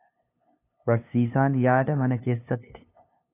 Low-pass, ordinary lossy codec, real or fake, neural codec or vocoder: 3.6 kHz; AAC, 24 kbps; fake; codec, 16 kHz, 2 kbps, FunCodec, trained on LibriTTS, 25 frames a second